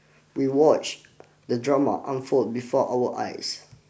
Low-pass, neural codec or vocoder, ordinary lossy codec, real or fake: none; none; none; real